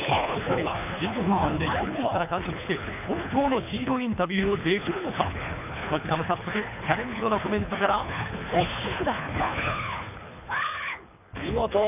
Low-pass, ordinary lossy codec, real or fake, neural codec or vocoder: 3.6 kHz; none; fake; codec, 24 kHz, 3 kbps, HILCodec